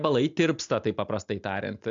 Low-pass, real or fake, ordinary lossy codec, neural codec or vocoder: 7.2 kHz; real; AAC, 64 kbps; none